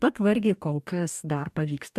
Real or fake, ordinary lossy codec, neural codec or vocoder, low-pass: fake; MP3, 96 kbps; codec, 44.1 kHz, 2.6 kbps, DAC; 14.4 kHz